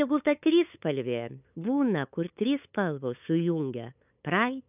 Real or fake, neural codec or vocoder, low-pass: fake; codec, 16 kHz, 8 kbps, FunCodec, trained on LibriTTS, 25 frames a second; 3.6 kHz